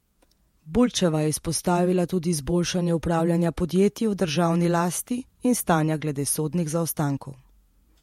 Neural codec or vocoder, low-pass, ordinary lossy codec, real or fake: vocoder, 48 kHz, 128 mel bands, Vocos; 19.8 kHz; MP3, 64 kbps; fake